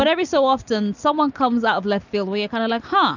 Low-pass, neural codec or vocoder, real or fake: 7.2 kHz; none; real